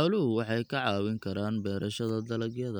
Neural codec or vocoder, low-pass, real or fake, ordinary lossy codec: none; none; real; none